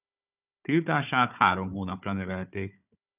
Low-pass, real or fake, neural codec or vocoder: 3.6 kHz; fake; codec, 16 kHz, 4 kbps, FunCodec, trained on Chinese and English, 50 frames a second